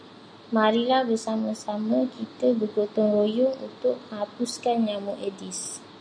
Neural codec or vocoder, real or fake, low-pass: none; real; 9.9 kHz